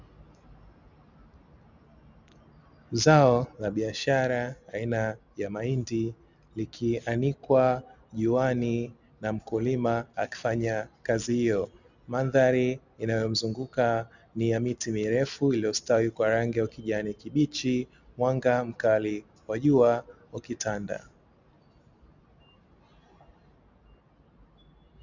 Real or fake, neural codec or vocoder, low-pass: real; none; 7.2 kHz